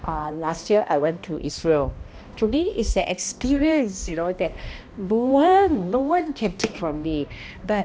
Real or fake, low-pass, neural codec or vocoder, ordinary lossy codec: fake; none; codec, 16 kHz, 1 kbps, X-Codec, HuBERT features, trained on balanced general audio; none